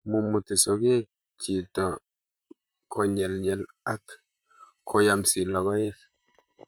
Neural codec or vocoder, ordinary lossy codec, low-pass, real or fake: vocoder, 44.1 kHz, 128 mel bands, Pupu-Vocoder; none; none; fake